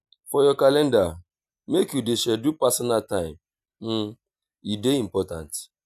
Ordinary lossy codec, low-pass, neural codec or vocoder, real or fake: none; 14.4 kHz; none; real